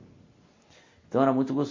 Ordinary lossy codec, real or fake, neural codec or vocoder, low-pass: MP3, 32 kbps; real; none; 7.2 kHz